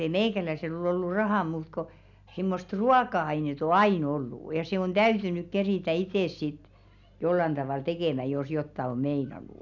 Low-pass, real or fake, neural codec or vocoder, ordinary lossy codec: 7.2 kHz; real; none; none